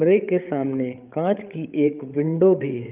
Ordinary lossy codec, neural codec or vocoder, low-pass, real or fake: Opus, 32 kbps; codec, 16 kHz, 8 kbps, FreqCodec, larger model; 3.6 kHz; fake